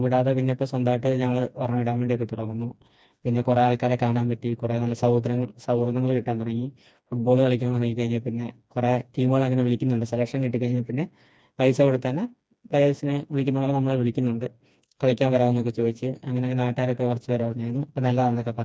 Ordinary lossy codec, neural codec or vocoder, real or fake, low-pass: none; codec, 16 kHz, 2 kbps, FreqCodec, smaller model; fake; none